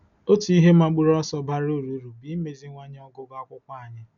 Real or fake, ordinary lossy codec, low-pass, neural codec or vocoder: real; none; 7.2 kHz; none